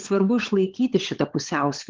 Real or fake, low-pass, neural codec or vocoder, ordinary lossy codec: fake; 7.2 kHz; codec, 16 kHz, 16 kbps, FunCodec, trained on LibriTTS, 50 frames a second; Opus, 16 kbps